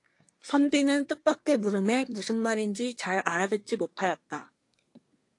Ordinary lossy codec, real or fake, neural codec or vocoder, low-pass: AAC, 48 kbps; fake; codec, 24 kHz, 1 kbps, SNAC; 10.8 kHz